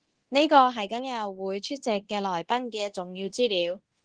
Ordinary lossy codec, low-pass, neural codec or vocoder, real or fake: Opus, 16 kbps; 9.9 kHz; codec, 24 kHz, 0.9 kbps, DualCodec; fake